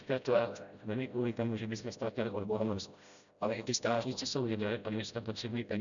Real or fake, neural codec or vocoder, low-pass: fake; codec, 16 kHz, 0.5 kbps, FreqCodec, smaller model; 7.2 kHz